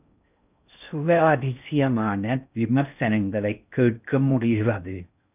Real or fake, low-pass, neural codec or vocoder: fake; 3.6 kHz; codec, 16 kHz in and 24 kHz out, 0.6 kbps, FocalCodec, streaming, 2048 codes